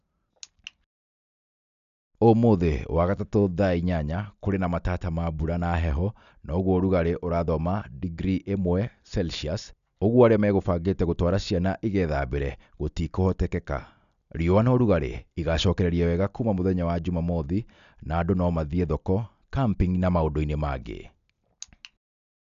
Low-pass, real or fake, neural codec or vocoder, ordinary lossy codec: 7.2 kHz; real; none; none